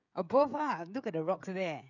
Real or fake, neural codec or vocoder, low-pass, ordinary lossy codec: fake; codec, 16 kHz, 16 kbps, FreqCodec, smaller model; 7.2 kHz; none